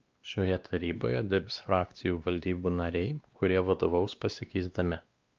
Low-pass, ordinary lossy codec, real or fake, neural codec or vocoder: 7.2 kHz; Opus, 24 kbps; fake; codec, 16 kHz, 2 kbps, X-Codec, WavLM features, trained on Multilingual LibriSpeech